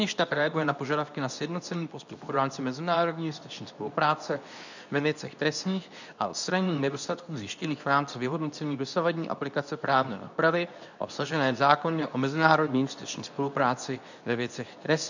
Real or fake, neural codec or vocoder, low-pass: fake; codec, 24 kHz, 0.9 kbps, WavTokenizer, medium speech release version 2; 7.2 kHz